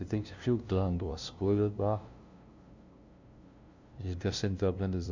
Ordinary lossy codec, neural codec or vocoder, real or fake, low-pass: Opus, 64 kbps; codec, 16 kHz, 0.5 kbps, FunCodec, trained on LibriTTS, 25 frames a second; fake; 7.2 kHz